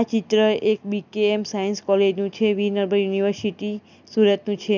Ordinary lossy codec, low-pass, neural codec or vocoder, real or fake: none; 7.2 kHz; none; real